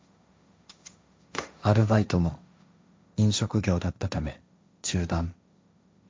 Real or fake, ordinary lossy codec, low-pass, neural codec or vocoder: fake; none; none; codec, 16 kHz, 1.1 kbps, Voila-Tokenizer